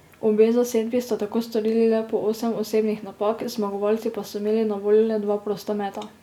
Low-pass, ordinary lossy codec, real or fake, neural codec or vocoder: 19.8 kHz; none; real; none